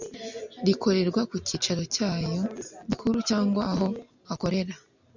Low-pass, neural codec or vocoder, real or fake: 7.2 kHz; none; real